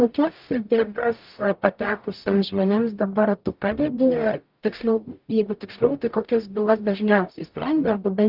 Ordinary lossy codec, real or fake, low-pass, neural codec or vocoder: Opus, 24 kbps; fake; 5.4 kHz; codec, 44.1 kHz, 0.9 kbps, DAC